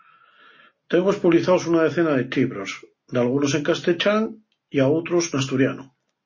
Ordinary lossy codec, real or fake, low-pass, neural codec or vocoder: MP3, 32 kbps; real; 7.2 kHz; none